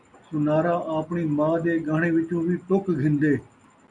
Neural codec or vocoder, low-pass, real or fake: none; 10.8 kHz; real